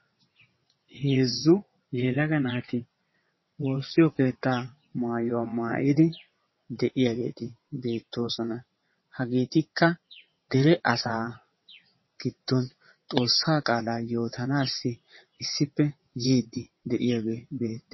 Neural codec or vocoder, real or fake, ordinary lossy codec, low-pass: vocoder, 22.05 kHz, 80 mel bands, WaveNeXt; fake; MP3, 24 kbps; 7.2 kHz